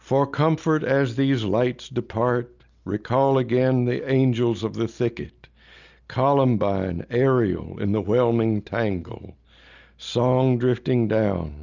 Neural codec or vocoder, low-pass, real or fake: none; 7.2 kHz; real